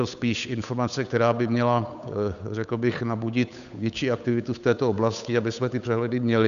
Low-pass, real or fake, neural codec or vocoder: 7.2 kHz; fake; codec, 16 kHz, 8 kbps, FunCodec, trained on Chinese and English, 25 frames a second